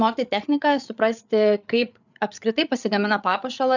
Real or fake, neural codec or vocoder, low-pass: fake; codec, 16 kHz, 8 kbps, FreqCodec, larger model; 7.2 kHz